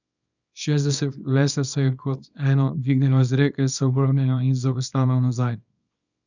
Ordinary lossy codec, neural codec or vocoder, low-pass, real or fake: none; codec, 24 kHz, 0.9 kbps, WavTokenizer, small release; 7.2 kHz; fake